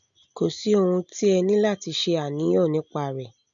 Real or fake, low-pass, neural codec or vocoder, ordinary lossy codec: real; 7.2 kHz; none; none